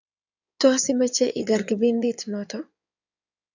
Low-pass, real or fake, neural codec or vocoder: 7.2 kHz; fake; codec, 16 kHz in and 24 kHz out, 2.2 kbps, FireRedTTS-2 codec